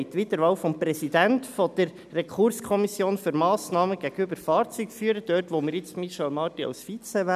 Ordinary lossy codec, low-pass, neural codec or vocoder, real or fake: none; 14.4 kHz; none; real